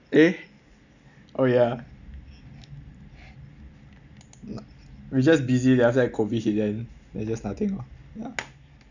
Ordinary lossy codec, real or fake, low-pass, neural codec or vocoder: none; real; 7.2 kHz; none